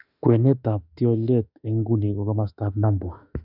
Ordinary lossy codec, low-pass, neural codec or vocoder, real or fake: none; 5.4 kHz; autoencoder, 48 kHz, 32 numbers a frame, DAC-VAE, trained on Japanese speech; fake